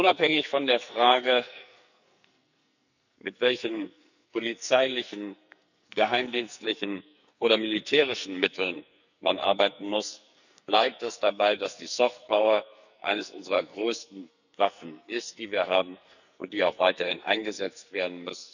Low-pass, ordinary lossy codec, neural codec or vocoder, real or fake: 7.2 kHz; none; codec, 44.1 kHz, 2.6 kbps, SNAC; fake